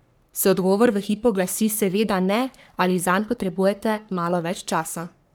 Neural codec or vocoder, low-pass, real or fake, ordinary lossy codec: codec, 44.1 kHz, 3.4 kbps, Pupu-Codec; none; fake; none